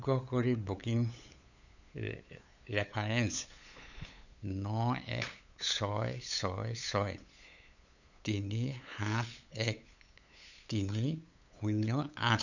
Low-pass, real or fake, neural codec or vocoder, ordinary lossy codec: 7.2 kHz; fake; codec, 16 kHz, 8 kbps, FunCodec, trained on LibriTTS, 25 frames a second; none